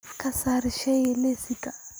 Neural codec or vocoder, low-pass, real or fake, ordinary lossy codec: none; none; real; none